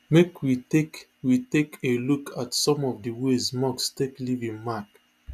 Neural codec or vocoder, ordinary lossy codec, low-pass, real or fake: none; none; 14.4 kHz; real